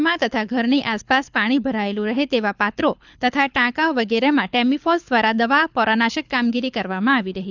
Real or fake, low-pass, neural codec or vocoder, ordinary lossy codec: fake; 7.2 kHz; codec, 24 kHz, 6 kbps, HILCodec; none